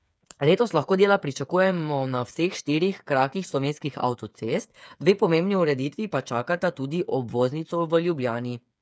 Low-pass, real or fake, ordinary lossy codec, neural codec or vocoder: none; fake; none; codec, 16 kHz, 8 kbps, FreqCodec, smaller model